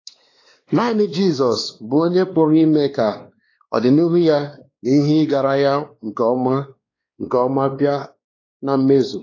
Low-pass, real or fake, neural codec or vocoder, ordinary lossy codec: 7.2 kHz; fake; codec, 16 kHz, 2 kbps, X-Codec, WavLM features, trained on Multilingual LibriSpeech; AAC, 32 kbps